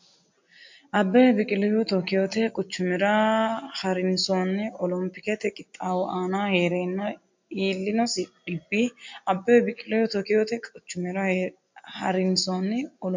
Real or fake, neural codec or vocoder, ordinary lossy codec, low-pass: real; none; MP3, 48 kbps; 7.2 kHz